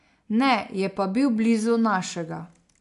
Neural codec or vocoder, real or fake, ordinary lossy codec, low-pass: none; real; AAC, 64 kbps; 10.8 kHz